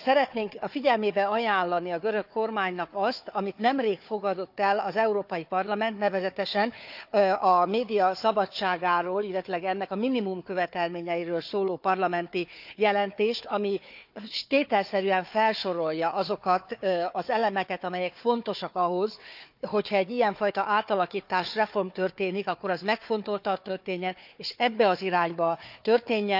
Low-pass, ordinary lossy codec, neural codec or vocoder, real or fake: 5.4 kHz; none; codec, 16 kHz, 4 kbps, FunCodec, trained on Chinese and English, 50 frames a second; fake